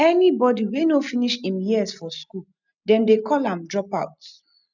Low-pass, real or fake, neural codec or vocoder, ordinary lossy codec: 7.2 kHz; real; none; none